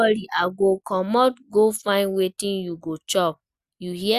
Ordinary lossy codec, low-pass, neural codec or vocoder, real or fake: Opus, 64 kbps; 14.4 kHz; none; real